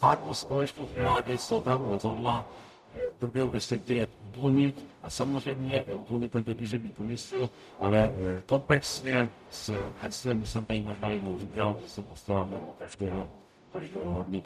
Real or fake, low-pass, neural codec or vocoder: fake; 14.4 kHz; codec, 44.1 kHz, 0.9 kbps, DAC